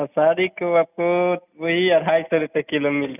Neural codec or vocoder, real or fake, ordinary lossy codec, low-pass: none; real; none; 3.6 kHz